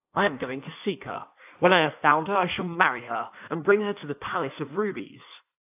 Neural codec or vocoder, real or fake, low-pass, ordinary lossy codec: codec, 16 kHz in and 24 kHz out, 1.1 kbps, FireRedTTS-2 codec; fake; 3.6 kHz; AAC, 32 kbps